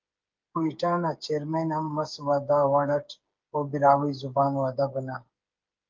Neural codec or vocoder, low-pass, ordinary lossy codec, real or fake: codec, 16 kHz, 8 kbps, FreqCodec, smaller model; 7.2 kHz; Opus, 32 kbps; fake